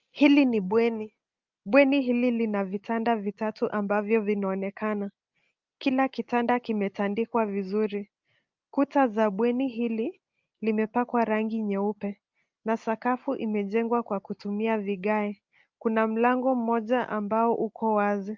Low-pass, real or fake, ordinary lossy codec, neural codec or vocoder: 7.2 kHz; real; Opus, 24 kbps; none